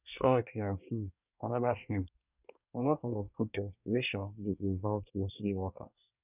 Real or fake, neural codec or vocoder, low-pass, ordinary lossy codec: fake; codec, 24 kHz, 1 kbps, SNAC; 3.6 kHz; none